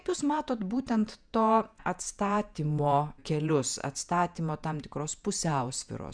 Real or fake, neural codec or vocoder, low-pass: fake; vocoder, 48 kHz, 128 mel bands, Vocos; 9.9 kHz